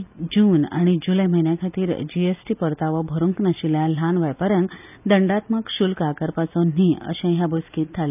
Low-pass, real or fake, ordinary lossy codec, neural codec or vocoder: 3.6 kHz; real; none; none